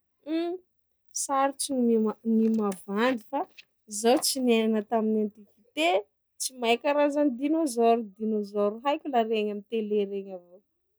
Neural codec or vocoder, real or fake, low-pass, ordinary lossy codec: none; real; none; none